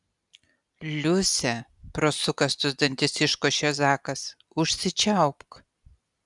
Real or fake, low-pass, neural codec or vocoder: real; 10.8 kHz; none